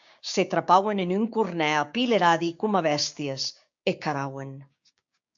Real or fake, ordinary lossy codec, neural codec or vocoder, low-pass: fake; AAC, 64 kbps; codec, 16 kHz, 6 kbps, DAC; 7.2 kHz